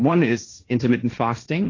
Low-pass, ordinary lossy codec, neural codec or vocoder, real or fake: 7.2 kHz; AAC, 32 kbps; codec, 16 kHz, 1.1 kbps, Voila-Tokenizer; fake